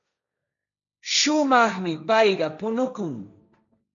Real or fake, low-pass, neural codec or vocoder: fake; 7.2 kHz; codec, 16 kHz, 1.1 kbps, Voila-Tokenizer